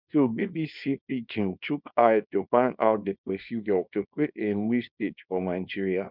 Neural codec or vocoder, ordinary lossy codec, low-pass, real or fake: codec, 24 kHz, 0.9 kbps, WavTokenizer, small release; none; 5.4 kHz; fake